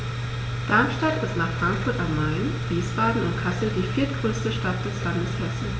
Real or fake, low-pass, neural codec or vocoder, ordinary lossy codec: real; none; none; none